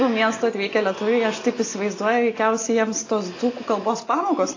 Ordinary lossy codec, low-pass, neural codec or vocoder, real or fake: AAC, 32 kbps; 7.2 kHz; none; real